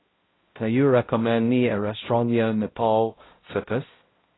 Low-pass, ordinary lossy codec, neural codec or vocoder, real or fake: 7.2 kHz; AAC, 16 kbps; codec, 16 kHz, 0.5 kbps, X-Codec, HuBERT features, trained on balanced general audio; fake